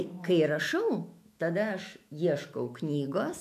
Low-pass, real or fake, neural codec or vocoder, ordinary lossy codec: 14.4 kHz; fake; autoencoder, 48 kHz, 128 numbers a frame, DAC-VAE, trained on Japanese speech; MP3, 96 kbps